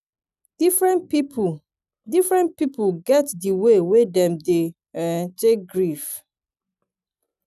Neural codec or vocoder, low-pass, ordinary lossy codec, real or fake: none; 14.4 kHz; none; real